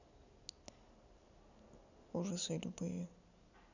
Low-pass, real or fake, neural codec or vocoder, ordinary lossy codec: 7.2 kHz; real; none; none